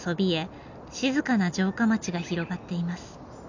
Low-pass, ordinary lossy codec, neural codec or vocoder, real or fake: 7.2 kHz; none; none; real